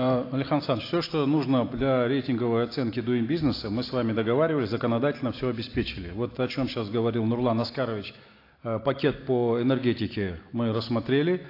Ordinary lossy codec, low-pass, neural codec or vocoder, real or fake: AAC, 32 kbps; 5.4 kHz; none; real